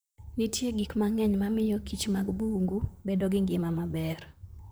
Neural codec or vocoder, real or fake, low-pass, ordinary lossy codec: vocoder, 44.1 kHz, 128 mel bands, Pupu-Vocoder; fake; none; none